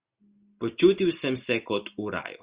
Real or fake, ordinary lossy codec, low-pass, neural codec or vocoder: real; Opus, 64 kbps; 3.6 kHz; none